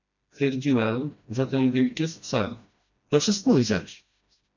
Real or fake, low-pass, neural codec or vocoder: fake; 7.2 kHz; codec, 16 kHz, 1 kbps, FreqCodec, smaller model